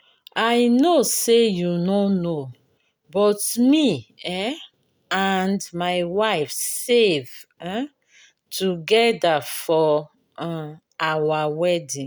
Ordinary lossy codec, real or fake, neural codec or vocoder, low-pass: none; real; none; none